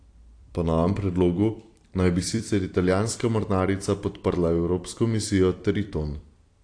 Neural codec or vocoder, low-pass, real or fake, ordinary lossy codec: vocoder, 44.1 kHz, 128 mel bands every 256 samples, BigVGAN v2; 9.9 kHz; fake; AAC, 48 kbps